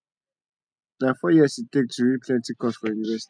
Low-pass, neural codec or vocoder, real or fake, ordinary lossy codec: 9.9 kHz; none; real; none